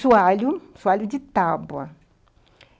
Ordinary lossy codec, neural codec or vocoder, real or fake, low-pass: none; none; real; none